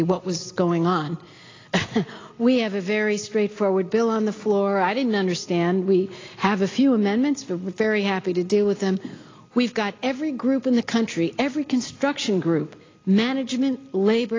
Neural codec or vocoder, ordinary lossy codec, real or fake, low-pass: none; AAC, 32 kbps; real; 7.2 kHz